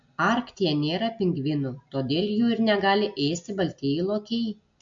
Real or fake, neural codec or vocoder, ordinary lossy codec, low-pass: real; none; MP3, 48 kbps; 7.2 kHz